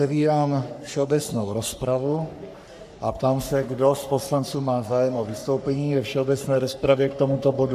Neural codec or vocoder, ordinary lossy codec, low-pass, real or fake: codec, 44.1 kHz, 3.4 kbps, Pupu-Codec; AAC, 96 kbps; 14.4 kHz; fake